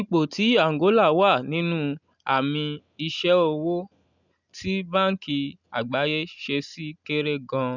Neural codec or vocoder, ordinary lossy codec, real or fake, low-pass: none; none; real; 7.2 kHz